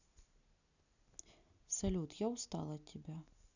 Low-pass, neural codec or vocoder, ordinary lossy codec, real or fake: 7.2 kHz; none; none; real